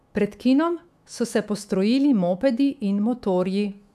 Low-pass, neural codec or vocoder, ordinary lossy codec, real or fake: 14.4 kHz; autoencoder, 48 kHz, 128 numbers a frame, DAC-VAE, trained on Japanese speech; none; fake